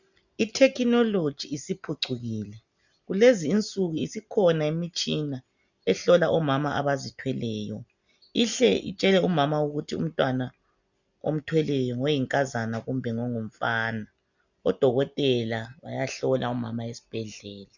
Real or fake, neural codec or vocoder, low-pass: real; none; 7.2 kHz